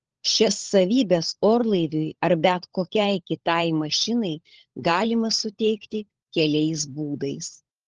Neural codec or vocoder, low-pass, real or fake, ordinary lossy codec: codec, 16 kHz, 16 kbps, FunCodec, trained on LibriTTS, 50 frames a second; 7.2 kHz; fake; Opus, 16 kbps